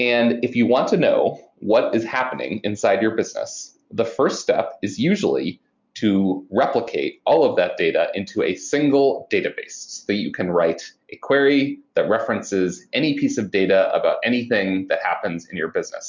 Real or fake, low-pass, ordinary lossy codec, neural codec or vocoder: real; 7.2 kHz; MP3, 64 kbps; none